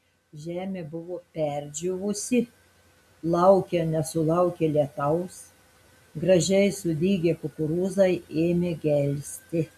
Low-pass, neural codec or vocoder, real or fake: 14.4 kHz; none; real